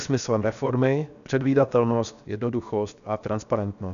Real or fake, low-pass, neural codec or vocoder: fake; 7.2 kHz; codec, 16 kHz, 0.7 kbps, FocalCodec